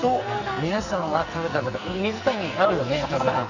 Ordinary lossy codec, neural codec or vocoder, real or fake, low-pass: none; codec, 44.1 kHz, 2.6 kbps, SNAC; fake; 7.2 kHz